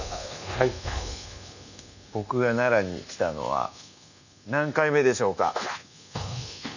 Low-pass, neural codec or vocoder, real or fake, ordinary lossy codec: 7.2 kHz; codec, 24 kHz, 1.2 kbps, DualCodec; fake; none